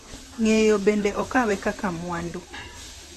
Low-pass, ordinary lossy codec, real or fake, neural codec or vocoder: 14.4 kHz; AAC, 48 kbps; fake; vocoder, 44.1 kHz, 128 mel bands, Pupu-Vocoder